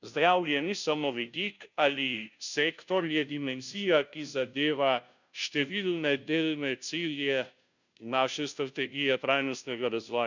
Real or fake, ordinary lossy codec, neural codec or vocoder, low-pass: fake; none; codec, 16 kHz, 0.5 kbps, FunCodec, trained on Chinese and English, 25 frames a second; 7.2 kHz